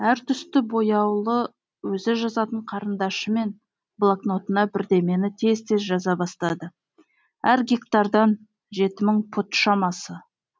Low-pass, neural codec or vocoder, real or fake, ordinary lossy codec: none; none; real; none